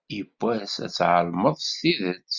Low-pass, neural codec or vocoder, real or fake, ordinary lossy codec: 7.2 kHz; none; real; Opus, 64 kbps